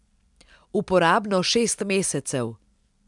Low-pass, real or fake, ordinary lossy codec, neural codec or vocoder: 10.8 kHz; real; none; none